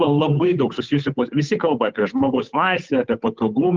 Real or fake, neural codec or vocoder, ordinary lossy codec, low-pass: fake; codec, 16 kHz, 8 kbps, FunCodec, trained on Chinese and English, 25 frames a second; Opus, 24 kbps; 7.2 kHz